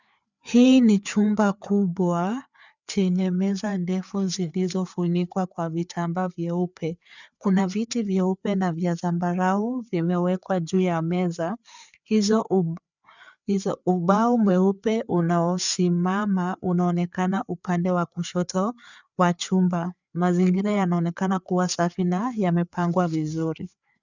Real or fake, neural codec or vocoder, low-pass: fake; codec, 16 kHz, 4 kbps, FreqCodec, larger model; 7.2 kHz